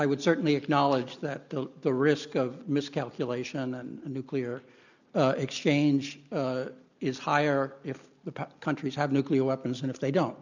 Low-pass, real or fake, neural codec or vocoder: 7.2 kHz; real; none